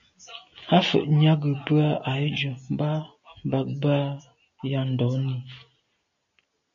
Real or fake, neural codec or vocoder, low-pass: real; none; 7.2 kHz